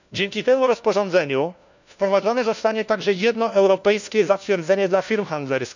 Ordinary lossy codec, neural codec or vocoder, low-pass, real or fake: none; codec, 16 kHz, 1 kbps, FunCodec, trained on LibriTTS, 50 frames a second; 7.2 kHz; fake